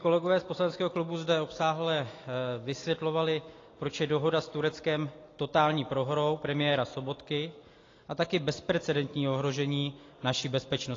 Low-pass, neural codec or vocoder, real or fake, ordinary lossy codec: 7.2 kHz; none; real; AAC, 32 kbps